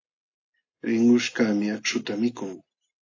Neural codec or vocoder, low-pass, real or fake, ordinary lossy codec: none; 7.2 kHz; real; AAC, 48 kbps